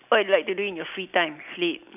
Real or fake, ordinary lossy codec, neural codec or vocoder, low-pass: real; none; none; 3.6 kHz